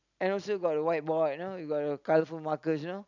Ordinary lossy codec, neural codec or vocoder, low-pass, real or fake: none; none; 7.2 kHz; real